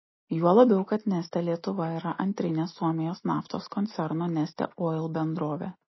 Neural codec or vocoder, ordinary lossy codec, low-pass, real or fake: none; MP3, 24 kbps; 7.2 kHz; real